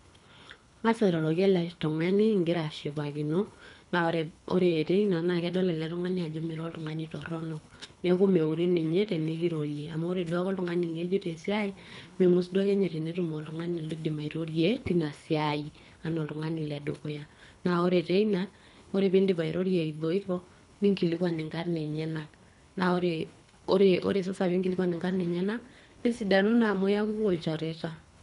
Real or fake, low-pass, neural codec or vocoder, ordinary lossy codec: fake; 10.8 kHz; codec, 24 kHz, 3 kbps, HILCodec; none